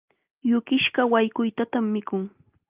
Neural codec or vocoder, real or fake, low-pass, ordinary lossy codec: none; real; 3.6 kHz; Opus, 24 kbps